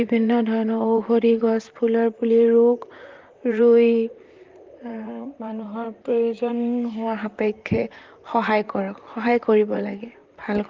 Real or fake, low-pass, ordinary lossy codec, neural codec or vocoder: fake; 7.2 kHz; Opus, 32 kbps; vocoder, 44.1 kHz, 128 mel bands, Pupu-Vocoder